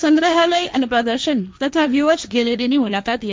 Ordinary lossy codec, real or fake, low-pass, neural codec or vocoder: none; fake; none; codec, 16 kHz, 1.1 kbps, Voila-Tokenizer